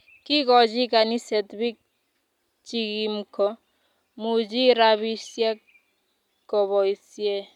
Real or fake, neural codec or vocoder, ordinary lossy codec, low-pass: real; none; none; 19.8 kHz